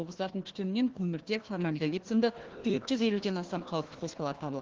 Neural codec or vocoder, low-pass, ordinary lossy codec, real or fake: codec, 16 kHz, 1 kbps, FunCodec, trained on Chinese and English, 50 frames a second; 7.2 kHz; Opus, 16 kbps; fake